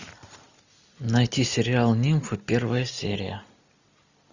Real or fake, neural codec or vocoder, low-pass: real; none; 7.2 kHz